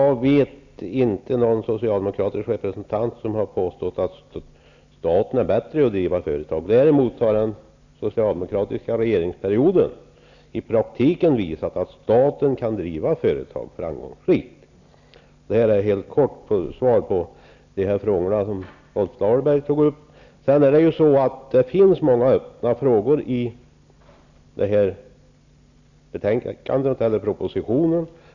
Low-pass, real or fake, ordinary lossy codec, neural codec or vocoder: 7.2 kHz; real; none; none